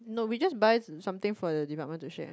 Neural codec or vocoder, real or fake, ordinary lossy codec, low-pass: none; real; none; none